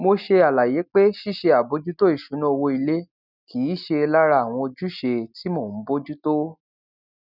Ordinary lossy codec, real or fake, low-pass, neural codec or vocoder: none; real; 5.4 kHz; none